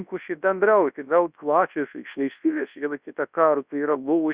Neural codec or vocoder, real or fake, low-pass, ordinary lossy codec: codec, 24 kHz, 0.9 kbps, WavTokenizer, large speech release; fake; 3.6 kHz; Opus, 64 kbps